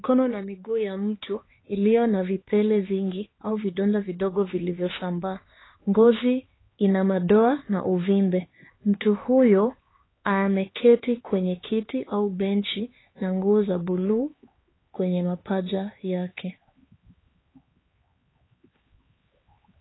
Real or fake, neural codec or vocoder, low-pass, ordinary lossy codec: fake; codec, 16 kHz, 4 kbps, X-Codec, HuBERT features, trained on LibriSpeech; 7.2 kHz; AAC, 16 kbps